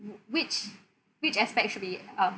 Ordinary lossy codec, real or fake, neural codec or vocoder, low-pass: none; real; none; none